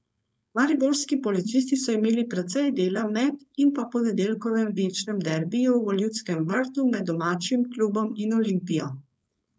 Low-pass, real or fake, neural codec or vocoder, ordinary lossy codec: none; fake; codec, 16 kHz, 4.8 kbps, FACodec; none